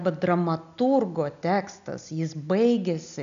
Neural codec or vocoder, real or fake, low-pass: none; real; 7.2 kHz